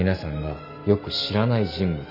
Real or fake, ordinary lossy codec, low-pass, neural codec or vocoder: real; AAC, 48 kbps; 5.4 kHz; none